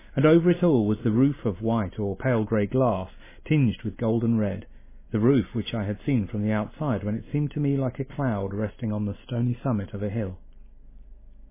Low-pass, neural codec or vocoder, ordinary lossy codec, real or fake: 3.6 kHz; none; MP3, 16 kbps; real